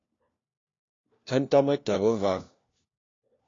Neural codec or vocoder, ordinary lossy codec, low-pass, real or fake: codec, 16 kHz, 1 kbps, FunCodec, trained on LibriTTS, 50 frames a second; AAC, 32 kbps; 7.2 kHz; fake